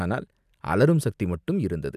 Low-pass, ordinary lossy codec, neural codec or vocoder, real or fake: 14.4 kHz; none; none; real